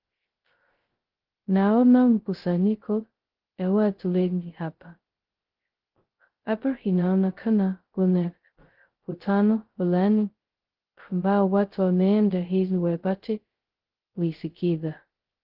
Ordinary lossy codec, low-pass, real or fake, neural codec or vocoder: Opus, 16 kbps; 5.4 kHz; fake; codec, 16 kHz, 0.2 kbps, FocalCodec